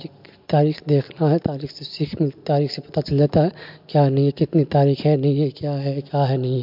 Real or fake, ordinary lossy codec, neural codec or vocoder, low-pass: real; MP3, 48 kbps; none; 5.4 kHz